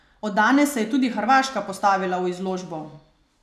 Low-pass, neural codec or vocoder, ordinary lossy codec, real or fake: 14.4 kHz; none; none; real